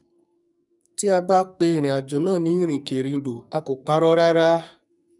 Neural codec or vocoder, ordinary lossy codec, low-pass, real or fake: codec, 44.1 kHz, 2.6 kbps, SNAC; none; 10.8 kHz; fake